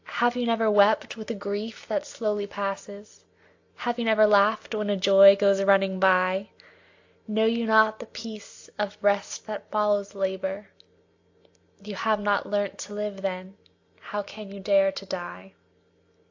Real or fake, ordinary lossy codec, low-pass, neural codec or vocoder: real; AAC, 48 kbps; 7.2 kHz; none